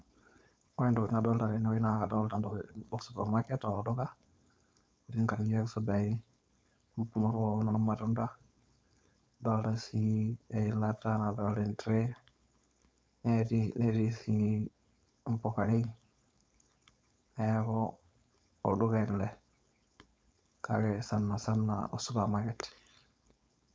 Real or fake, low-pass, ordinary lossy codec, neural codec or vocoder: fake; none; none; codec, 16 kHz, 4.8 kbps, FACodec